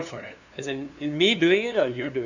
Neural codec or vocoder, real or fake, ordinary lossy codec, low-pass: codec, 16 kHz, 2 kbps, FunCodec, trained on LibriTTS, 25 frames a second; fake; none; 7.2 kHz